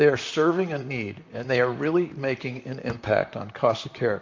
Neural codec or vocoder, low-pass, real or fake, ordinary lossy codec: vocoder, 44.1 kHz, 128 mel bands, Pupu-Vocoder; 7.2 kHz; fake; MP3, 48 kbps